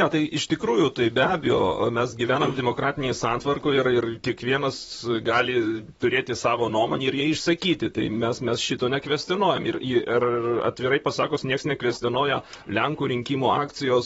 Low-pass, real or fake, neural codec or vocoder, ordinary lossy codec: 19.8 kHz; fake; vocoder, 44.1 kHz, 128 mel bands, Pupu-Vocoder; AAC, 24 kbps